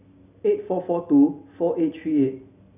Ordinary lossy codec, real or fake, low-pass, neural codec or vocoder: none; real; 3.6 kHz; none